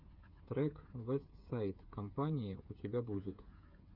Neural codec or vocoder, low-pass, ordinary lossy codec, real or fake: codec, 16 kHz, 8 kbps, FreqCodec, smaller model; 5.4 kHz; Opus, 64 kbps; fake